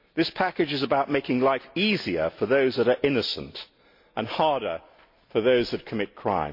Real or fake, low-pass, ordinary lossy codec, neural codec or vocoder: real; 5.4 kHz; MP3, 32 kbps; none